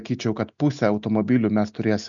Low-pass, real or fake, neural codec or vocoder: 7.2 kHz; real; none